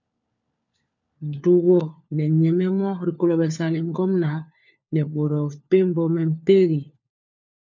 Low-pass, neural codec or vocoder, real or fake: 7.2 kHz; codec, 16 kHz, 4 kbps, FunCodec, trained on LibriTTS, 50 frames a second; fake